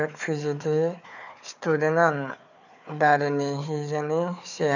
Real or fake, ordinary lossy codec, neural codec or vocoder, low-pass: fake; none; codec, 16 kHz, 4 kbps, FunCodec, trained on Chinese and English, 50 frames a second; 7.2 kHz